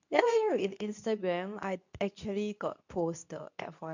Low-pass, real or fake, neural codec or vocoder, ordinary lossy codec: 7.2 kHz; fake; codec, 24 kHz, 0.9 kbps, WavTokenizer, medium speech release version 2; none